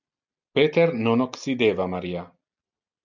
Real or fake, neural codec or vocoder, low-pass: real; none; 7.2 kHz